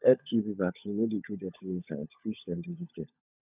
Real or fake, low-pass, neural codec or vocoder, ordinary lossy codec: fake; 3.6 kHz; codec, 16 kHz, 16 kbps, FunCodec, trained on LibriTTS, 50 frames a second; none